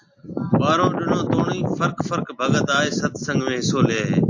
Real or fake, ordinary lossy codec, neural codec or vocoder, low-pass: real; AAC, 48 kbps; none; 7.2 kHz